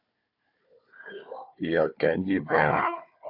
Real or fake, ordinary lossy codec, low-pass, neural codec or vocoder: fake; AAC, 48 kbps; 5.4 kHz; codec, 16 kHz, 4 kbps, FunCodec, trained on Chinese and English, 50 frames a second